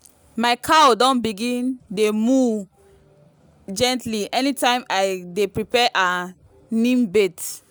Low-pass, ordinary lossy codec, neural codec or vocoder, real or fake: none; none; none; real